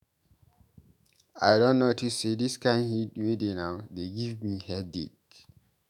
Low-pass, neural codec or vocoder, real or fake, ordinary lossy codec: 19.8 kHz; autoencoder, 48 kHz, 128 numbers a frame, DAC-VAE, trained on Japanese speech; fake; none